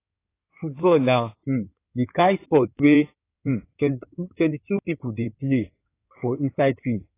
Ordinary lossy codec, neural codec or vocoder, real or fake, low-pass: AAC, 24 kbps; codec, 16 kHz in and 24 kHz out, 2.2 kbps, FireRedTTS-2 codec; fake; 3.6 kHz